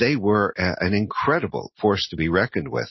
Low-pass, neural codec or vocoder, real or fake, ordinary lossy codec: 7.2 kHz; none; real; MP3, 24 kbps